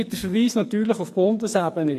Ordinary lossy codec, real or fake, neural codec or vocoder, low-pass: AAC, 64 kbps; fake; codec, 44.1 kHz, 2.6 kbps, SNAC; 14.4 kHz